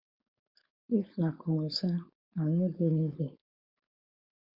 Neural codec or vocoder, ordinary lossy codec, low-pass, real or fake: codec, 16 kHz, 4.8 kbps, FACodec; Opus, 64 kbps; 5.4 kHz; fake